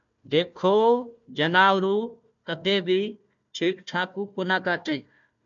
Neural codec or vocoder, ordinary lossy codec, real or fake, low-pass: codec, 16 kHz, 1 kbps, FunCodec, trained on Chinese and English, 50 frames a second; MP3, 64 kbps; fake; 7.2 kHz